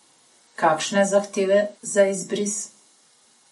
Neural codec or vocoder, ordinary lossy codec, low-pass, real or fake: none; MP3, 48 kbps; 14.4 kHz; real